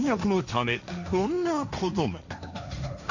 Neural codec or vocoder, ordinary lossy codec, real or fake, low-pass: codec, 16 kHz, 1.1 kbps, Voila-Tokenizer; none; fake; 7.2 kHz